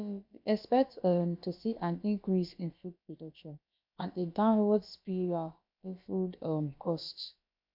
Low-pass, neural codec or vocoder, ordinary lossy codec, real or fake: 5.4 kHz; codec, 16 kHz, about 1 kbps, DyCAST, with the encoder's durations; MP3, 48 kbps; fake